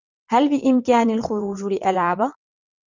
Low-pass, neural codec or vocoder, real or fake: 7.2 kHz; vocoder, 22.05 kHz, 80 mel bands, WaveNeXt; fake